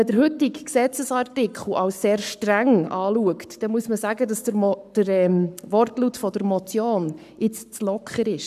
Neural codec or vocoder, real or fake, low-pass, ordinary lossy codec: codec, 44.1 kHz, 7.8 kbps, Pupu-Codec; fake; 14.4 kHz; none